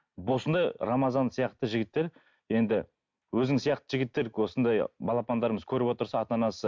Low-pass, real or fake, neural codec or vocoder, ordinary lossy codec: 7.2 kHz; real; none; MP3, 64 kbps